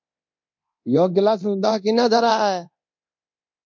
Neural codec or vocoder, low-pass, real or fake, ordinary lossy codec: codec, 24 kHz, 0.9 kbps, DualCodec; 7.2 kHz; fake; MP3, 48 kbps